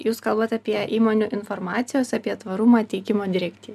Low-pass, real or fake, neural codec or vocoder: 14.4 kHz; real; none